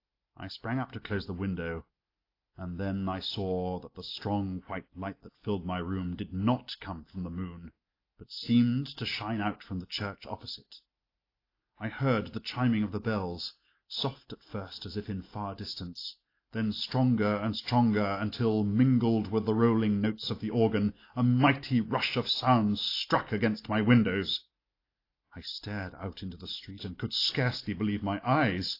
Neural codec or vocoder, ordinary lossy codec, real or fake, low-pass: none; AAC, 32 kbps; real; 5.4 kHz